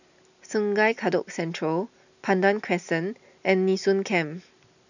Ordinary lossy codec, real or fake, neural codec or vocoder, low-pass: none; real; none; 7.2 kHz